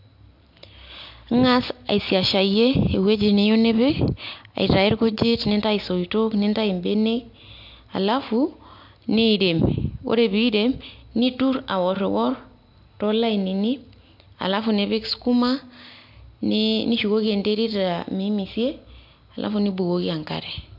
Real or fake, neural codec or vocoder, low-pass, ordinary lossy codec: real; none; 5.4 kHz; MP3, 48 kbps